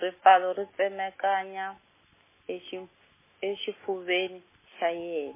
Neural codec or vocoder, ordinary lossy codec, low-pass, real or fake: none; MP3, 16 kbps; 3.6 kHz; real